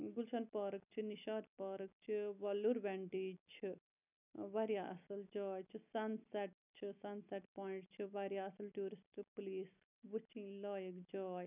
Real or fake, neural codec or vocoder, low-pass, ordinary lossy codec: real; none; 3.6 kHz; none